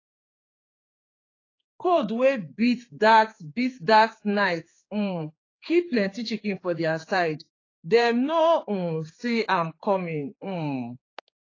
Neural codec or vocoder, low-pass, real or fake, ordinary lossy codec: codec, 16 kHz, 4 kbps, X-Codec, HuBERT features, trained on general audio; 7.2 kHz; fake; AAC, 32 kbps